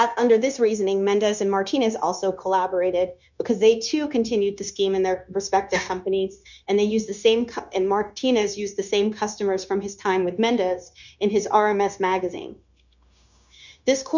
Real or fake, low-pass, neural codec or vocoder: fake; 7.2 kHz; codec, 16 kHz, 0.9 kbps, LongCat-Audio-Codec